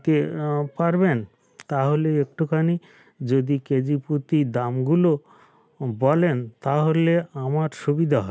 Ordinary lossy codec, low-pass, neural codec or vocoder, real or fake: none; none; none; real